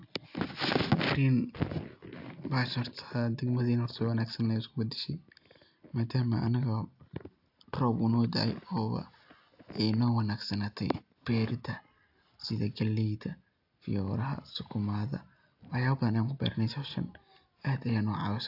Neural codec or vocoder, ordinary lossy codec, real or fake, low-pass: none; AAC, 48 kbps; real; 5.4 kHz